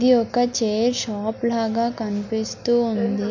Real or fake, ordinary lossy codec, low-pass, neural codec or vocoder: real; none; 7.2 kHz; none